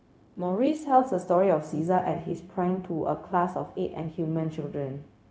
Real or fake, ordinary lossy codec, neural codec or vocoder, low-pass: fake; none; codec, 16 kHz, 0.4 kbps, LongCat-Audio-Codec; none